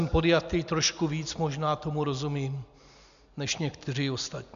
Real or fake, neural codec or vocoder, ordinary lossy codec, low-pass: real; none; AAC, 96 kbps; 7.2 kHz